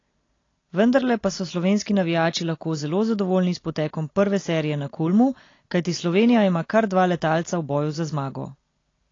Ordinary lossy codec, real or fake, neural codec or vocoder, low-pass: AAC, 32 kbps; real; none; 7.2 kHz